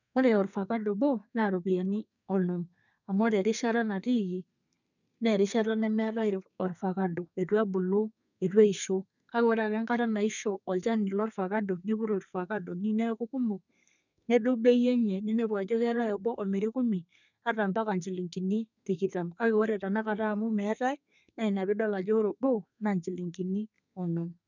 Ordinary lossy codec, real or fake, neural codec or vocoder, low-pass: none; fake; codec, 32 kHz, 1.9 kbps, SNAC; 7.2 kHz